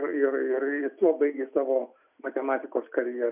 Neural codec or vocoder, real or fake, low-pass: none; real; 3.6 kHz